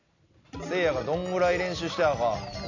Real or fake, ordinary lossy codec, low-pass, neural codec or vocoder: real; AAC, 48 kbps; 7.2 kHz; none